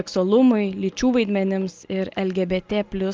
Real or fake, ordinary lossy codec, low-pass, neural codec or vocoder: real; Opus, 32 kbps; 7.2 kHz; none